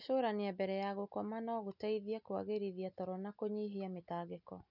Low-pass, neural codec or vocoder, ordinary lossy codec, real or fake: 5.4 kHz; none; none; real